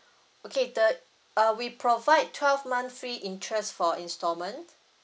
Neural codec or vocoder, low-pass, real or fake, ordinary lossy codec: none; none; real; none